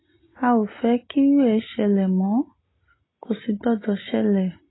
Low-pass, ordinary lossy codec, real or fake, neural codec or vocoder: 7.2 kHz; AAC, 16 kbps; real; none